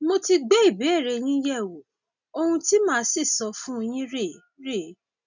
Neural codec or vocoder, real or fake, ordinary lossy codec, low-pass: none; real; none; 7.2 kHz